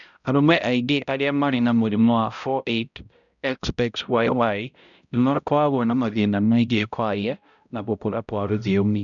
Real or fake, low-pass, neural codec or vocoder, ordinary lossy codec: fake; 7.2 kHz; codec, 16 kHz, 0.5 kbps, X-Codec, HuBERT features, trained on balanced general audio; none